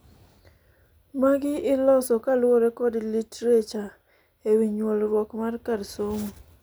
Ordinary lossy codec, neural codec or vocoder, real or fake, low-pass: none; none; real; none